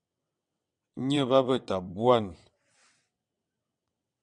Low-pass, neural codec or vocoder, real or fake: 9.9 kHz; vocoder, 22.05 kHz, 80 mel bands, WaveNeXt; fake